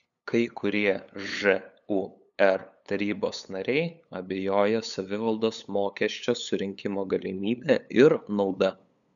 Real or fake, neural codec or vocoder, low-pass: fake; codec, 16 kHz, 8 kbps, FunCodec, trained on LibriTTS, 25 frames a second; 7.2 kHz